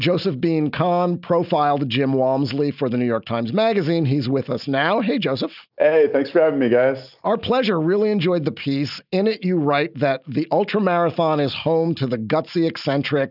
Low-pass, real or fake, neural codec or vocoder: 5.4 kHz; real; none